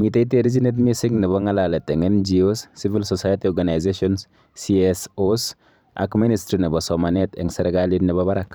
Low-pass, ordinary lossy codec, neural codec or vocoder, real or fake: none; none; vocoder, 44.1 kHz, 128 mel bands every 512 samples, BigVGAN v2; fake